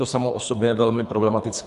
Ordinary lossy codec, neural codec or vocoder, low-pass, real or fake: Opus, 64 kbps; codec, 24 kHz, 3 kbps, HILCodec; 10.8 kHz; fake